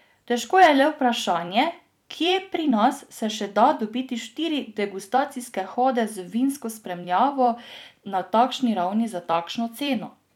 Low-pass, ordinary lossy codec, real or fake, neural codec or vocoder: 19.8 kHz; none; fake; vocoder, 44.1 kHz, 128 mel bands every 256 samples, BigVGAN v2